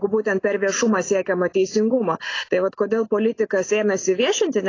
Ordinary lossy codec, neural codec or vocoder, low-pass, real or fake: AAC, 32 kbps; none; 7.2 kHz; real